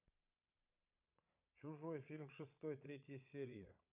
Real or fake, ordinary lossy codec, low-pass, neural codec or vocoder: fake; none; 3.6 kHz; vocoder, 22.05 kHz, 80 mel bands, Vocos